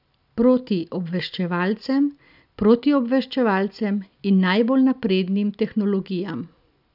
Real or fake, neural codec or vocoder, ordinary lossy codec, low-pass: real; none; none; 5.4 kHz